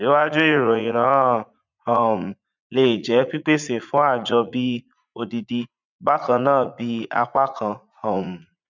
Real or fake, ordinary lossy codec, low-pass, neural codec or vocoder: fake; none; 7.2 kHz; vocoder, 44.1 kHz, 80 mel bands, Vocos